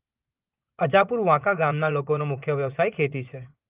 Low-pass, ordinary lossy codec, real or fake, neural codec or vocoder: 3.6 kHz; Opus, 32 kbps; fake; vocoder, 24 kHz, 100 mel bands, Vocos